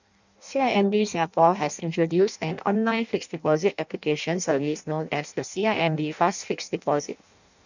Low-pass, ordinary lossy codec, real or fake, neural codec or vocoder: 7.2 kHz; none; fake; codec, 16 kHz in and 24 kHz out, 0.6 kbps, FireRedTTS-2 codec